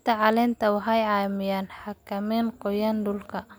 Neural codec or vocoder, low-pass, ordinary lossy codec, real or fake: none; none; none; real